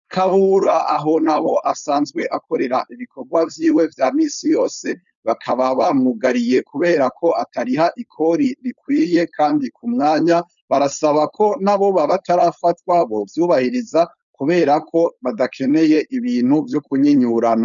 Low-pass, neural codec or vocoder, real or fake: 7.2 kHz; codec, 16 kHz, 4.8 kbps, FACodec; fake